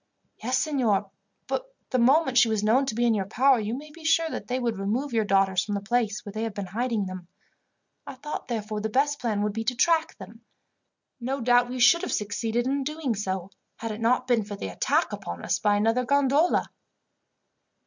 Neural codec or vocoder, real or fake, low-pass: none; real; 7.2 kHz